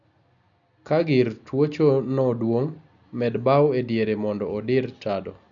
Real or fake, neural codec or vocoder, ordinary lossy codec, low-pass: real; none; MP3, 96 kbps; 7.2 kHz